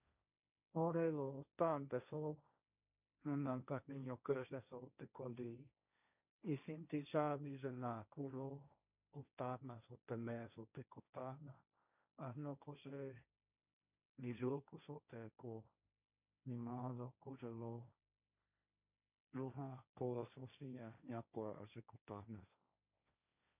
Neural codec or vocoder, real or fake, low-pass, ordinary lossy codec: codec, 16 kHz, 1.1 kbps, Voila-Tokenizer; fake; 3.6 kHz; none